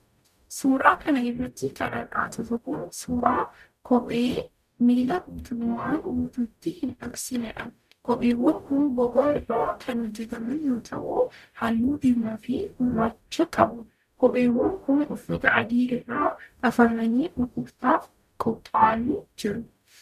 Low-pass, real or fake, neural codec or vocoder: 14.4 kHz; fake; codec, 44.1 kHz, 0.9 kbps, DAC